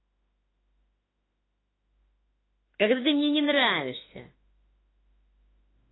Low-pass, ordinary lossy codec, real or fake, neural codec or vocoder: 7.2 kHz; AAC, 16 kbps; fake; autoencoder, 48 kHz, 32 numbers a frame, DAC-VAE, trained on Japanese speech